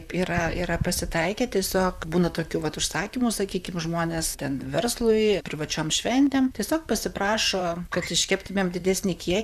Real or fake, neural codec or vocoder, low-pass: fake; vocoder, 44.1 kHz, 128 mel bands, Pupu-Vocoder; 14.4 kHz